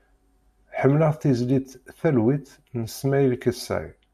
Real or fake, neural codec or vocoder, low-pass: real; none; 14.4 kHz